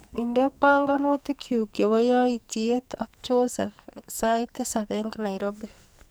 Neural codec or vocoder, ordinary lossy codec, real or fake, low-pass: codec, 44.1 kHz, 2.6 kbps, SNAC; none; fake; none